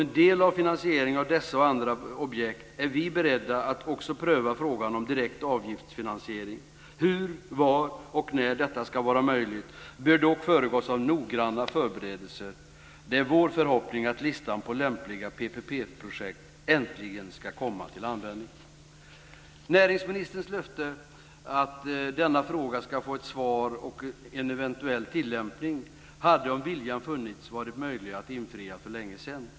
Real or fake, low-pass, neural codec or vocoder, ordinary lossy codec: real; none; none; none